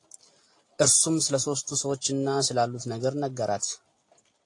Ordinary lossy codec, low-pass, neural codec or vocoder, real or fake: AAC, 48 kbps; 10.8 kHz; none; real